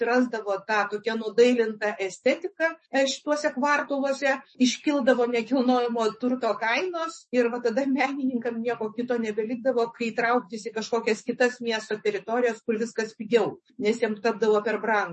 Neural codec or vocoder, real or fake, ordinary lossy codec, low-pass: autoencoder, 48 kHz, 128 numbers a frame, DAC-VAE, trained on Japanese speech; fake; MP3, 32 kbps; 10.8 kHz